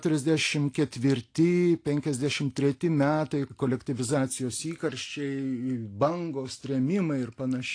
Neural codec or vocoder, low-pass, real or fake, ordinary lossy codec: none; 9.9 kHz; real; AAC, 48 kbps